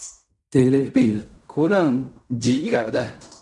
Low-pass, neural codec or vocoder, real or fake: 10.8 kHz; codec, 16 kHz in and 24 kHz out, 0.4 kbps, LongCat-Audio-Codec, fine tuned four codebook decoder; fake